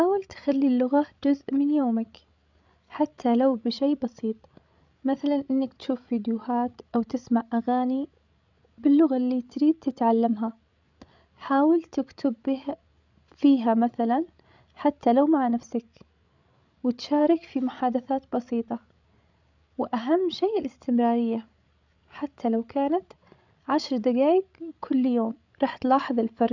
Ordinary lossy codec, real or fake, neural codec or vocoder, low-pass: none; fake; codec, 16 kHz, 16 kbps, FreqCodec, larger model; 7.2 kHz